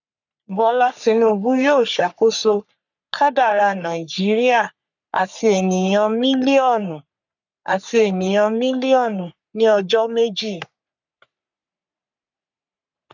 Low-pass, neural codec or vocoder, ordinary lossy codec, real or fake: 7.2 kHz; codec, 44.1 kHz, 3.4 kbps, Pupu-Codec; none; fake